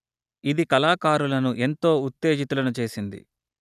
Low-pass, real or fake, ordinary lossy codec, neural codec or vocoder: 14.4 kHz; real; none; none